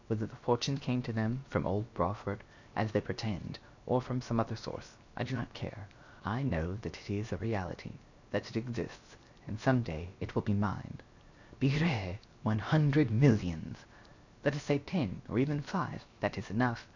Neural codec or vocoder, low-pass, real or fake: codec, 16 kHz, 0.7 kbps, FocalCodec; 7.2 kHz; fake